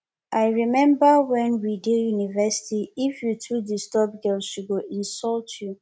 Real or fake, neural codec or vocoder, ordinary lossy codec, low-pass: real; none; none; none